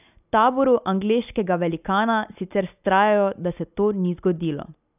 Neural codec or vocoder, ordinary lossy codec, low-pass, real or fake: none; none; 3.6 kHz; real